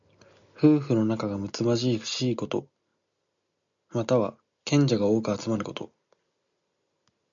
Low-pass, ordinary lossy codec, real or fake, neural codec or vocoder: 7.2 kHz; MP3, 96 kbps; real; none